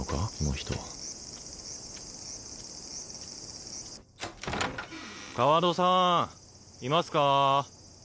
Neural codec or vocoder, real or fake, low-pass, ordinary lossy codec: none; real; none; none